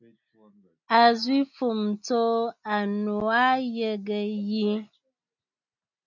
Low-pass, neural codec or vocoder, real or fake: 7.2 kHz; none; real